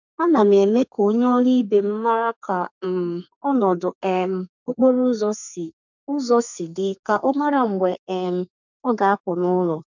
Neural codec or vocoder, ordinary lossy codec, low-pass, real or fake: codec, 32 kHz, 1.9 kbps, SNAC; none; 7.2 kHz; fake